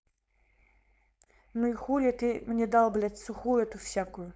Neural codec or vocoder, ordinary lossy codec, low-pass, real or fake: codec, 16 kHz, 4.8 kbps, FACodec; none; none; fake